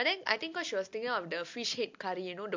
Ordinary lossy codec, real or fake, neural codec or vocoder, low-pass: MP3, 48 kbps; real; none; 7.2 kHz